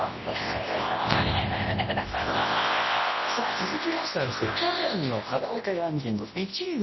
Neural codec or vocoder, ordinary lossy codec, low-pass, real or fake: codec, 24 kHz, 0.9 kbps, WavTokenizer, large speech release; MP3, 24 kbps; 7.2 kHz; fake